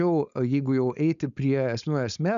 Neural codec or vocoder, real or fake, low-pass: codec, 16 kHz, 4.8 kbps, FACodec; fake; 7.2 kHz